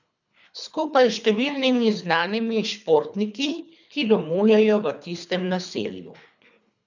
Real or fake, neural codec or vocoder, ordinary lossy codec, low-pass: fake; codec, 24 kHz, 3 kbps, HILCodec; none; 7.2 kHz